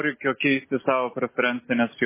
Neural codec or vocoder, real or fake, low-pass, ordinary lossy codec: none; real; 3.6 kHz; MP3, 16 kbps